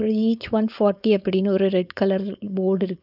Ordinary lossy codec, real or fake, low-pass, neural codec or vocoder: none; fake; 5.4 kHz; codec, 16 kHz, 4.8 kbps, FACodec